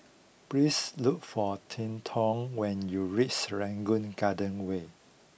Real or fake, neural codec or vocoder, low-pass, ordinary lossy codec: real; none; none; none